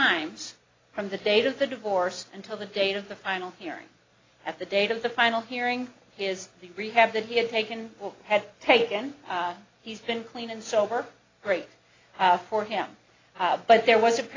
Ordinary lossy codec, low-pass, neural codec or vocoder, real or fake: AAC, 32 kbps; 7.2 kHz; none; real